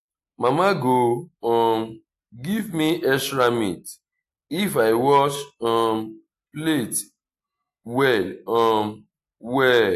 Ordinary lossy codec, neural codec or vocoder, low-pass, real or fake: AAC, 64 kbps; none; 14.4 kHz; real